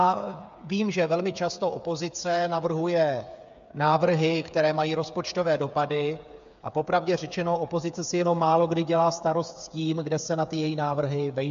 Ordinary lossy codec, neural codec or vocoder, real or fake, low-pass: MP3, 64 kbps; codec, 16 kHz, 8 kbps, FreqCodec, smaller model; fake; 7.2 kHz